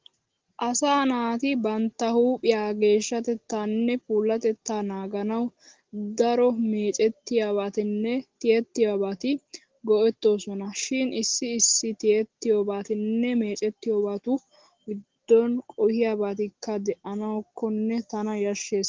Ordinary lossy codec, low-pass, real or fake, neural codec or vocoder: Opus, 16 kbps; 7.2 kHz; real; none